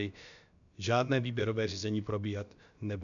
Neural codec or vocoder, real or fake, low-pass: codec, 16 kHz, about 1 kbps, DyCAST, with the encoder's durations; fake; 7.2 kHz